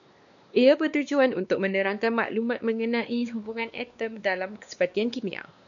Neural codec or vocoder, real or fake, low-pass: codec, 16 kHz, 2 kbps, X-Codec, WavLM features, trained on Multilingual LibriSpeech; fake; 7.2 kHz